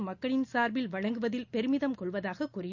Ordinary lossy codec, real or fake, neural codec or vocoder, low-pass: none; real; none; 7.2 kHz